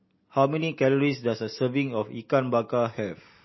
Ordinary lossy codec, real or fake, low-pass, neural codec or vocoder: MP3, 24 kbps; real; 7.2 kHz; none